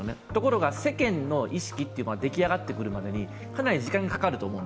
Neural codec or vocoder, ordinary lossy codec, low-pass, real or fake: none; none; none; real